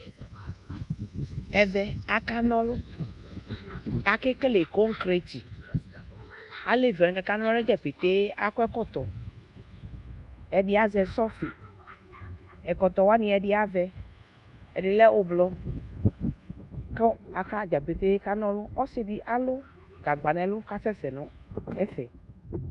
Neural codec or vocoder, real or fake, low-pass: codec, 24 kHz, 1.2 kbps, DualCodec; fake; 10.8 kHz